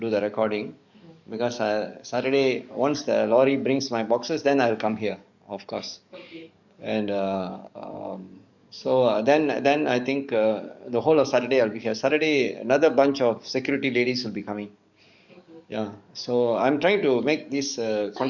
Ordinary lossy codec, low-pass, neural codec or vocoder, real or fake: none; 7.2 kHz; codec, 44.1 kHz, 7.8 kbps, DAC; fake